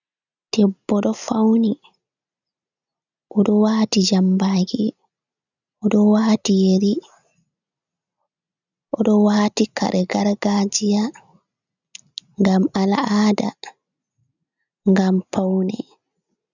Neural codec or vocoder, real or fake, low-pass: none; real; 7.2 kHz